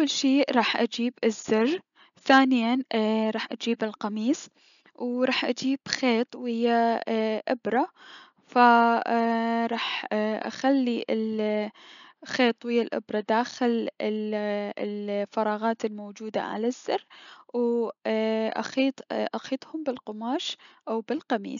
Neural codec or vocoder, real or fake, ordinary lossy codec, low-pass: none; real; none; 7.2 kHz